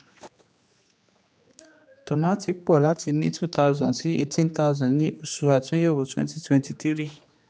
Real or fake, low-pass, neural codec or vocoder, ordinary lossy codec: fake; none; codec, 16 kHz, 2 kbps, X-Codec, HuBERT features, trained on general audio; none